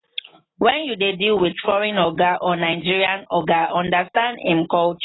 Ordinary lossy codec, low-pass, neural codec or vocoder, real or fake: AAC, 16 kbps; 7.2 kHz; none; real